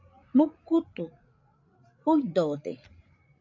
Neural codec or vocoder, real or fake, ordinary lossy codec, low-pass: codec, 16 kHz, 8 kbps, FreqCodec, larger model; fake; MP3, 48 kbps; 7.2 kHz